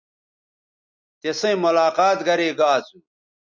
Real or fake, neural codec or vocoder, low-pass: real; none; 7.2 kHz